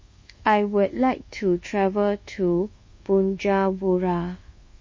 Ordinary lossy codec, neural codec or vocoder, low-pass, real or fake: MP3, 32 kbps; codec, 24 kHz, 1.2 kbps, DualCodec; 7.2 kHz; fake